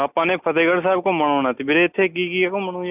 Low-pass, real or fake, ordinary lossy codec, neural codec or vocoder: 3.6 kHz; real; none; none